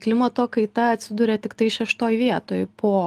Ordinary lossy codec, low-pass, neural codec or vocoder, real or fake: Opus, 32 kbps; 14.4 kHz; none; real